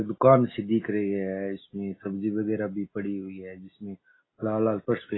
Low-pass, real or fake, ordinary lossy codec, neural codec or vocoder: 7.2 kHz; real; AAC, 16 kbps; none